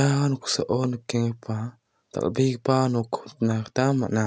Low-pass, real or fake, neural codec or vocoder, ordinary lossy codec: none; real; none; none